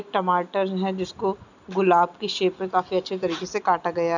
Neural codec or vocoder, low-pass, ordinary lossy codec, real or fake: none; 7.2 kHz; none; real